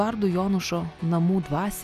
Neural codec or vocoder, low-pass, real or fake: none; 14.4 kHz; real